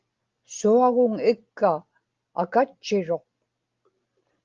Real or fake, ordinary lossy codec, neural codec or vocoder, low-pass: real; Opus, 32 kbps; none; 7.2 kHz